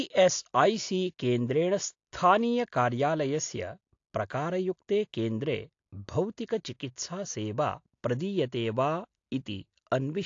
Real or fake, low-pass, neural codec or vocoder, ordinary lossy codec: real; 7.2 kHz; none; AAC, 48 kbps